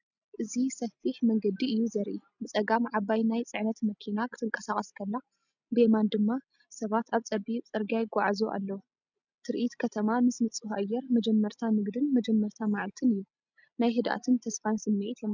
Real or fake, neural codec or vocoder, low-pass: real; none; 7.2 kHz